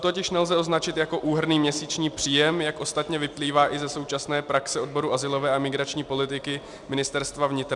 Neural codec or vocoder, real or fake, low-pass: none; real; 10.8 kHz